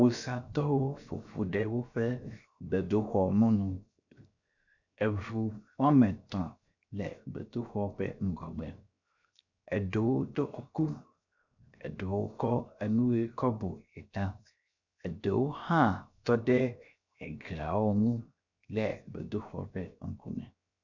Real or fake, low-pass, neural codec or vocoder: fake; 7.2 kHz; codec, 16 kHz, 0.7 kbps, FocalCodec